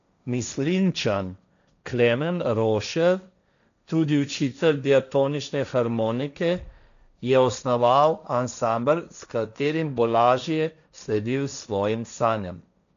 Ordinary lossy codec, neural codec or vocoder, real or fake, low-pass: none; codec, 16 kHz, 1.1 kbps, Voila-Tokenizer; fake; 7.2 kHz